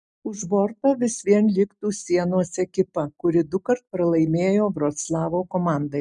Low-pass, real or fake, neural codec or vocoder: 10.8 kHz; real; none